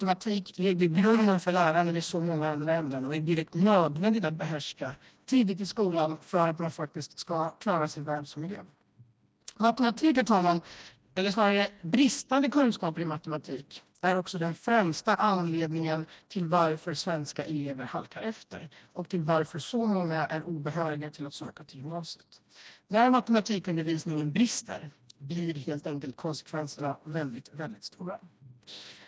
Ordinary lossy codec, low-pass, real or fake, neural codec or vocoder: none; none; fake; codec, 16 kHz, 1 kbps, FreqCodec, smaller model